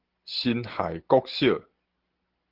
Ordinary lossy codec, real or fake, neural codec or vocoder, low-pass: Opus, 16 kbps; real; none; 5.4 kHz